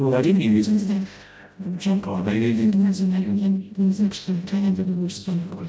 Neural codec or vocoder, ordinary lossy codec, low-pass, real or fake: codec, 16 kHz, 0.5 kbps, FreqCodec, smaller model; none; none; fake